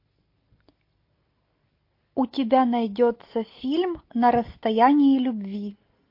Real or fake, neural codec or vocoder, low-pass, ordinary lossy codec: real; none; 5.4 kHz; MP3, 32 kbps